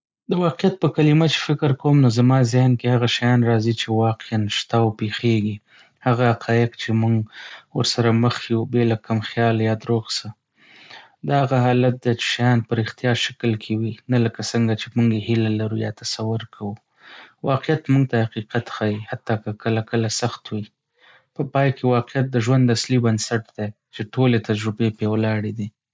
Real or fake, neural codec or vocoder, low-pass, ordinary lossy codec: real; none; none; none